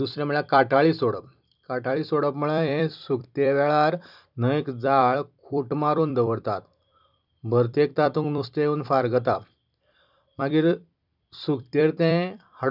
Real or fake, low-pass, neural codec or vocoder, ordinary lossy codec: fake; 5.4 kHz; vocoder, 44.1 kHz, 128 mel bands every 256 samples, BigVGAN v2; none